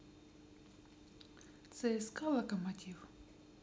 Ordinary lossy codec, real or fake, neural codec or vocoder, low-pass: none; real; none; none